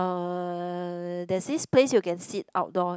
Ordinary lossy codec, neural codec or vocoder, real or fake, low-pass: none; none; real; none